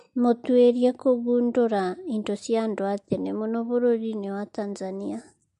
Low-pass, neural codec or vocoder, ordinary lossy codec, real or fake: 10.8 kHz; none; MP3, 48 kbps; real